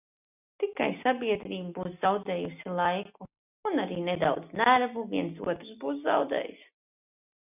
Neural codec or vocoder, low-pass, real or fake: none; 3.6 kHz; real